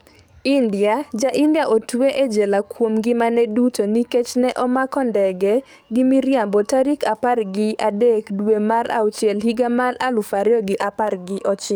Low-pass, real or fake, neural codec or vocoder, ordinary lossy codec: none; fake; codec, 44.1 kHz, 7.8 kbps, DAC; none